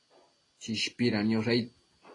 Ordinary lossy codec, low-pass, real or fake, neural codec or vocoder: AAC, 32 kbps; 10.8 kHz; real; none